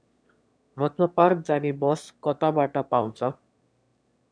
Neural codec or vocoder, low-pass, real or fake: autoencoder, 22.05 kHz, a latent of 192 numbers a frame, VITS, trained on one speaker; 9.9 kHz; fake